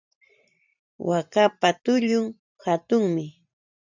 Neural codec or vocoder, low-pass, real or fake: vocoder, 44.1 kHz, 128 mel bands every 512 samples, BigVGAN v2; 7.2 kHz; fake